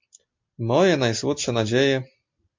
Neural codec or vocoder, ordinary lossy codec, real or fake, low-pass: none; MP3, 48 kbps; real; 7.2 kHz